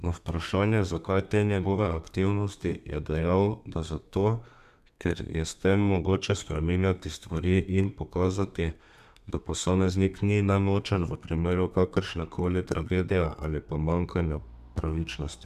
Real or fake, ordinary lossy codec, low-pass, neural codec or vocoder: fake; none; 14.4 kHz; codec, 32 kHz, 1.9 kbps, SNAC